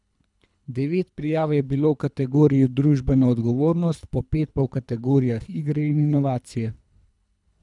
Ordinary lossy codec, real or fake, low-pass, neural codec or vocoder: none; fake; 10.8 kHz; codec, 24 kHz, 3 kbps, HILCodec